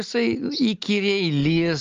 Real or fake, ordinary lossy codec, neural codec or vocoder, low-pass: real; Opus, 24 kbps; none; 7.2 kHz